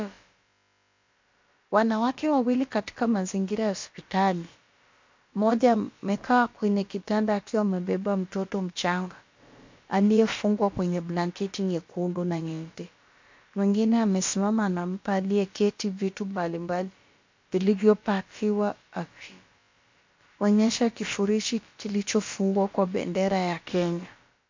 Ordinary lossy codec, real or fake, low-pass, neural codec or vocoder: MP3, 48 kbps; fake; 7.2 kHz; codec, 16 kHz, about 1 kbps, DyCAST, with the encoder's durations